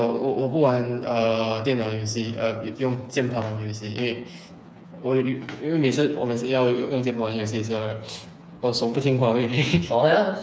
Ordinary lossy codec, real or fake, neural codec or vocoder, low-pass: none; fake; codec, 16 kHz, 4 kbps, FreqCodec, smaller model; none